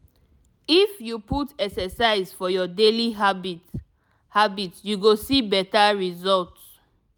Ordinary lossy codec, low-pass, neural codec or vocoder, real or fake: none; none; none; real